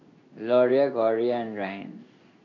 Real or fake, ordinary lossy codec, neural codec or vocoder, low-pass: fake; MP3, 64 kbps; codec, 16 kHz in and 24 kHz out, 1 kbps, XY-Tokenizer; 7.2 kHz